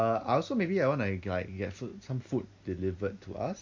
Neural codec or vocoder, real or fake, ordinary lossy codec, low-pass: none; real; MP3, 48 kbps; 7.2 kHz